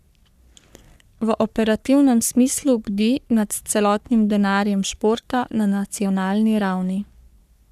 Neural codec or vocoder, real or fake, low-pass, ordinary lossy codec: codec, 44.1 kHz, 3.4 kbps, Pupu-Codec; fake; 14.4 kHz; none